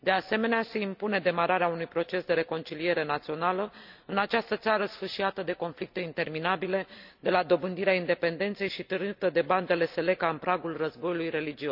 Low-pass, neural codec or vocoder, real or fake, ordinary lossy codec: 5.4 kHz; none; real; none